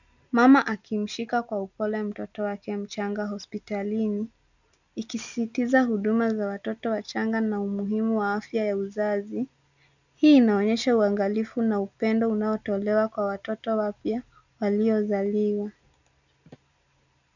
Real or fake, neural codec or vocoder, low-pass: real; none; 7.2 kHz